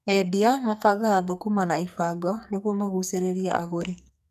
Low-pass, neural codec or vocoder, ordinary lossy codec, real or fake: 14.4 kHz; codec, 44.1 kHz, 2.6 kbps, SNAC; none; fake